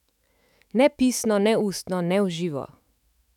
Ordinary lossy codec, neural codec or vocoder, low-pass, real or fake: none; autoencoder, 48 kHz, 128 numbers a frame, DAC-VAE, trained on Japanese speech; 19.8 kHz; fake